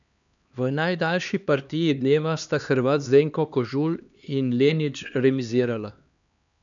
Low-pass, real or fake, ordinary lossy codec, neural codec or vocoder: 7.2 kHz; fake; none; codec, 16 kHz, 2 kbps, X-Codec, HuBERT features, trained on LibriSpeech